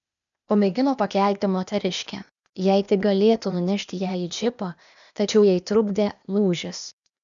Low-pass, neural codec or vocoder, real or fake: 7.2 kHz; codec, 16 kHz, 0.8 kbps, ZipCodec; fake